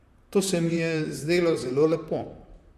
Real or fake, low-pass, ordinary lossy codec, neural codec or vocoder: fake; 14.4 kHz; MP3, 64 kbps; vocoder, 44.1 kHz, 128 mel bands, Pupu-Vocoder